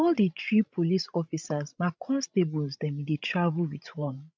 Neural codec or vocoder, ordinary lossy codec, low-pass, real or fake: codec, 16 kHz, 16 kbps, FreqCodec, larger model; none; none; fake